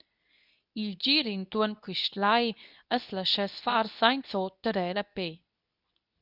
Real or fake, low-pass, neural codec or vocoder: fake; 5.4 kHz; codec, 24 kHz, 0.9 kbps, WavTokenizer, medium speech release version 2